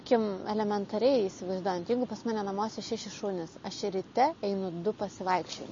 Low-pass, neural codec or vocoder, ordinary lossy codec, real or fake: 7.2 kHz; none; MP3, 32 kbps; real